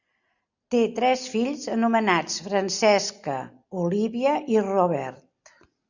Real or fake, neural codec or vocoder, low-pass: real; none; 7.2 kHz